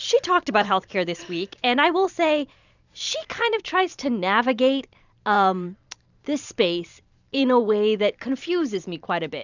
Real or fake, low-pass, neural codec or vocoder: fake; 7.2 kHz; vocoder, 44.1 kHz, 128 mel bands every 512 samples, BigVGAN v2